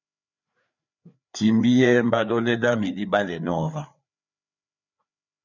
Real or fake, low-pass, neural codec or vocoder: fake; 7.2 kHz; codec, 16 kHz, 4 kbps, FreqCodec, larger model